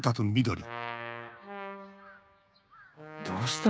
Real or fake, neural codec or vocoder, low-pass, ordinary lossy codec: fake; codec, 16 kHz, 6 kbps, DAC; none; none